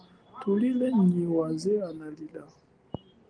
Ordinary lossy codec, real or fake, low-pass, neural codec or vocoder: Opus, 24 kbps; real; 9.9 kHz; none